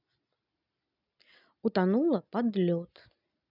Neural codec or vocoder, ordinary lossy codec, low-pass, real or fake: none; none; 5.4 kHz; real